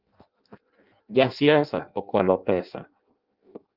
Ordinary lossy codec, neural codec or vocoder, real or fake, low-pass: Opus, 24 kbps; codec, 16 kHz in and 24 kHz out, 0.6 kbps, FireRedTTS-2 codec; fake; 5.4 kHz